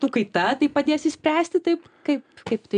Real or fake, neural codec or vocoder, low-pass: real; none; 9.9 kHz